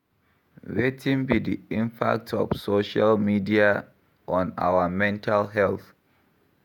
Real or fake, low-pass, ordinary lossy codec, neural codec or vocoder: fake; 19.8 kHz; none; vocoder, 48 kHz, 128 mel bands, Vocos